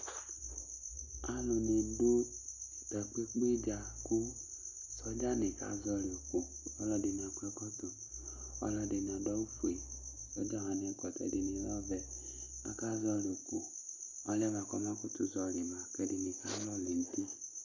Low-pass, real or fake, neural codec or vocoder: 7.2 kHz; real; none